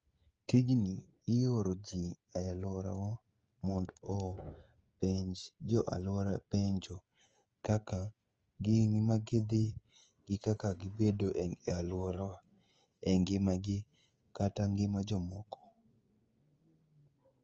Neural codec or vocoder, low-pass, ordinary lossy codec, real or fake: codec, 16 kHz, 16 kbps, FreqCodec, smaller model; 7.2 kHz; Opus, 24 kbps; fake